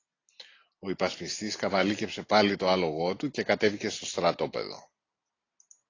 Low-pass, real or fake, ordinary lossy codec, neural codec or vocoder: 7.2 kHz; real; AAC, 32 kbps; none